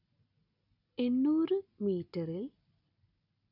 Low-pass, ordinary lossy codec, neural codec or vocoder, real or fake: 5.4 kHz; none; none; real